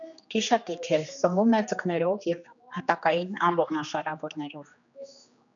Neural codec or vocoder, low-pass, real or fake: codec, 16 kHz, 2 kbps, X-Codec, HuBERT features, trained on general audio; 7.2 kHz; fake